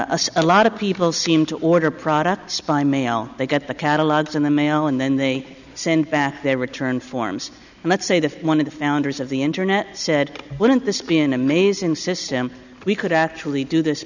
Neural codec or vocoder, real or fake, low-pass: none; real; 7.2 kHz